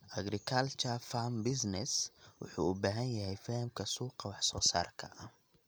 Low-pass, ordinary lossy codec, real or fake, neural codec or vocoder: none; none; real; none